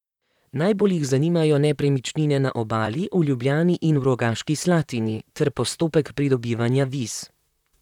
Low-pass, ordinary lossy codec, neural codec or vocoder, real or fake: 19.8 kHz; none; vocoder, 44.1 kHz, 128 mel bands, Pupu-Vocoder; fake